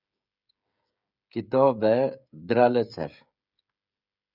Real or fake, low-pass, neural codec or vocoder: fake; 5.4 kHz; codec, 16 kHz, 16 kbps, FreqCodec, smaller model